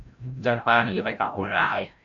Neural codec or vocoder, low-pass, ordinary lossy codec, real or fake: codec, 16 kHz, 0.5 kbps, FreqCodec, larger model; 7.2 kHz; AAC, 64 kbps; fake